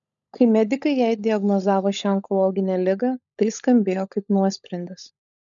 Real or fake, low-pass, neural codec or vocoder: fake; 7.2 kHz; codec, 16 kHz, 16 kbps, FunCodec, trained on LibriTTS, 50 frames a second